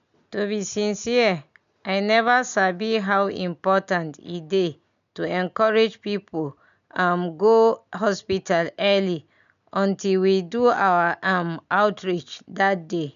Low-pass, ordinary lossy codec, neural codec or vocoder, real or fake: 7.2 kHz; none; none; real